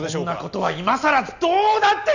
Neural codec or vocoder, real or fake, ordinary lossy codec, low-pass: none; real; none; 7.2 kHz